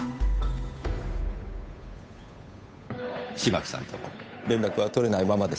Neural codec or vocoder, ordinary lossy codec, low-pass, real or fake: codec, 16 kHz, 8 kbps, FunCodec, trained on Chinese and English, 25 frames a second; none; none; fake